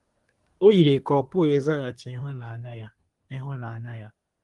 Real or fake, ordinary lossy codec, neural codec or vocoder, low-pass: fake; Opus, 24 kbps; codec, 24 kHz, 1 kbps, SNAC; 10.8 kHz